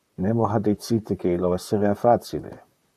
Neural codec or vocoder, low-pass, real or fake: vocoder, 44.1 kHz, 128 mel bands, Pupu-Vocoder; 14.4 kHz; fake